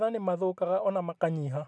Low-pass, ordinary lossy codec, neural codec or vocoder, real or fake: none; none; none; real